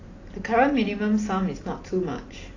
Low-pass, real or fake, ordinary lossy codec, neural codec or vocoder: 7.2 kHz; real; AAC, 32 kbps; none